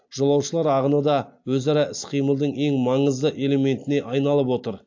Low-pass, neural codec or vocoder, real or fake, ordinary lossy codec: 7.2 kHz; none; real; AAC, 48 kbps